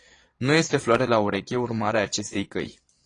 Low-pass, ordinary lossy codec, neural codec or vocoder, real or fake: 9.9 kHz; AAC, 32 kbps; vocoder, 22.05 kHz, 80 mel bands, Vocos; fake